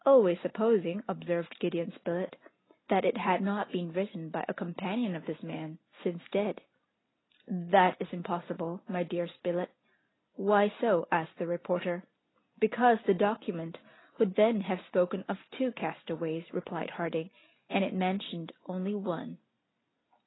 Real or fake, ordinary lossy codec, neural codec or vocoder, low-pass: real; AAC, 16 kbps; none; 7.2 kHz